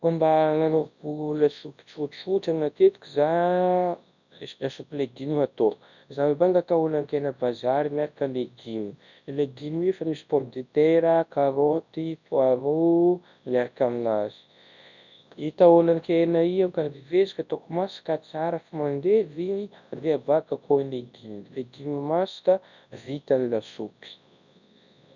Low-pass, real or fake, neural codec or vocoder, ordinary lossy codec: 7.2 kHz; fake; codec, 24 kHz, 0.9 kbps, WavTokenizer, large speech release; none